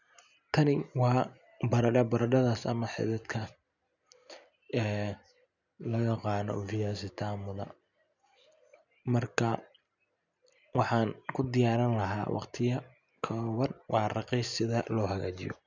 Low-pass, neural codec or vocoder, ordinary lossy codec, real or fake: 7.2 kHz; none; none; real